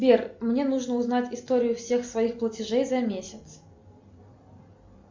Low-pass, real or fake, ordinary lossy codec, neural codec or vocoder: 7.2 kHz; real; MP3, 64 kbps; none